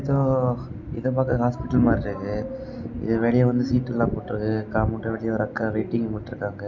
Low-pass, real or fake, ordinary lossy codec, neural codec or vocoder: 7.2 kHz; real; none; none